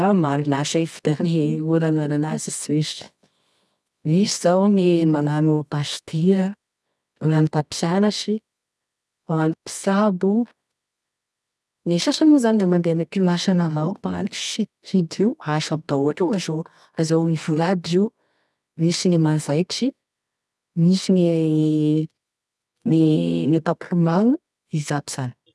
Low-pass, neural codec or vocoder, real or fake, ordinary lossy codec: none; codec, 24 kHz, 0.9 kbps, WavTokenizer, medium music audio release; fake; none